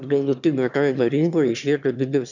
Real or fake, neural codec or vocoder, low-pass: fake; autoencoder, 22.05 kHz, a latent of 192 numbers a frame, VITS, trained on one speaker; 7.2 kHz